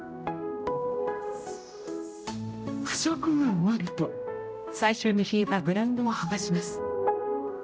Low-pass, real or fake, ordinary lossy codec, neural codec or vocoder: none; fake; none; codec, 16 kHz, 0.5 kbps, X-Codec, HuBERT features, trained on general audio